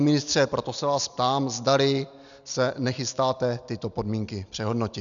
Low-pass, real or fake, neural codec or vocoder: 7.2 kHz; real; none